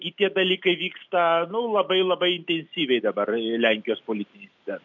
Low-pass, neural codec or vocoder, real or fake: 7.2 kHz; none; real